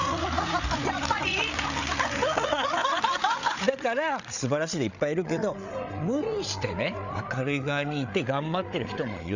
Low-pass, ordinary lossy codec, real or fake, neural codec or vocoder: 7.2 kHz; none; fake; codec, 16 kHz, 8 kbps, FreqCodec, larger model